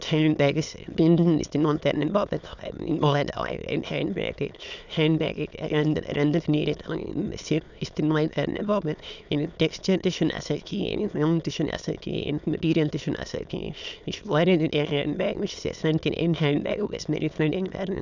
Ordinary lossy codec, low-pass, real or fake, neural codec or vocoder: none; 7.2 kHz; fake; autoencoder, 22.05 kHz, a latent of 192 numbers a frame, VITS, trained on many speakers